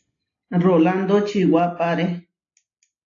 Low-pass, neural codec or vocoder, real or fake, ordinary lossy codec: 7.2 kHz; none; real; AAC, 48 kbps